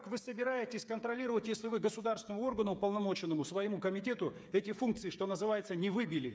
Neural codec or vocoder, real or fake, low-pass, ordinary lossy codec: codec, 16 kHz, 8 kbps, FreqCodec, smaller model; fake; none; none